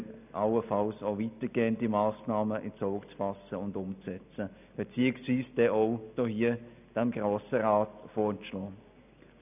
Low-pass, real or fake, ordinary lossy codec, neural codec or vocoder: 3.6 kHz; real; none; none